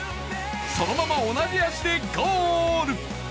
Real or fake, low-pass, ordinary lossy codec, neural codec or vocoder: real; none; none; none